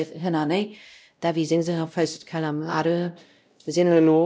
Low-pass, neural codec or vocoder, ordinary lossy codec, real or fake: none; codec, 16 kHz, 0.5 kbps, X-Codec, WavLM features, trained on Multilingual LibriSpeech; none; fake